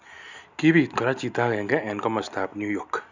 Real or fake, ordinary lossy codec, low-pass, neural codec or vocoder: real; none; 7.2 kHz; none